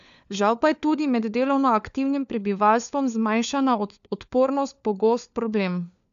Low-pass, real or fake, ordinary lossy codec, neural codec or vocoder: 7.2 kHz; fake; none; codec, 16 kHz, 4 kbps, FunCodec, trained on LibriTTS, 50 frames a second